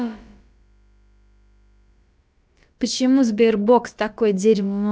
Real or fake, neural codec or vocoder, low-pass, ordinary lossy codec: fake; codec, 16 kHz, about 1 kbps, DyCAST, with the encoder's durations; none; none